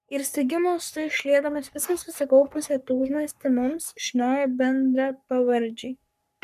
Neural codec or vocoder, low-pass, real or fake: codec, 44.1 kHz, 3.4 kbps, Pupu-Codec; 14.4 kHz; fake